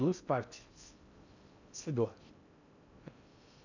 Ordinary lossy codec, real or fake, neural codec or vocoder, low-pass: none; fake; codec, 16 kHz in and 24 kHz out, 0.6 kbps, FocalCodec, streaming, 4096 codes; 7.2 kHz